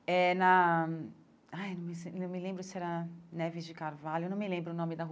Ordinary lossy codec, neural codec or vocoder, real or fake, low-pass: none; none; real; none